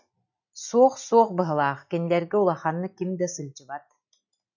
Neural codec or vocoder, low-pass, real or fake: none; 7.2 kHz; real